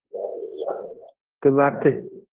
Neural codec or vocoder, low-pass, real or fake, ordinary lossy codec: codec, 16 kHz in and 24 kHz out, 0.9 kbps, LongCat-Audio-Codec, fine tuned four codebook decoder; 3.6 kHz; fake; Opus, 16 kbps